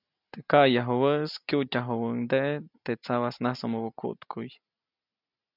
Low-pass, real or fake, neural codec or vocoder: 5.4 kHz; real; none